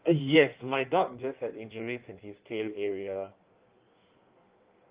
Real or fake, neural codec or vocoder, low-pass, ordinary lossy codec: fake; codec, 16 kHz in and 24 kHz out, 1.1 kbps, FireRedTTS-2 codec; 3.6 kHz; Opus, 32 kbps